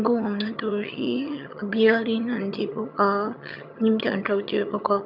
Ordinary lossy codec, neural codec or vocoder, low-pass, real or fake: none; vocoder, 22.05 kHz, 80 mel bands, HiFi-GAN; 5.4 kHz; fake